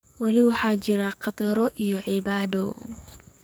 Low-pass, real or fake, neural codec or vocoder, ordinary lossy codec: none; fake; codec, 44.1 kHz, 2.6 kbps, SNAC; none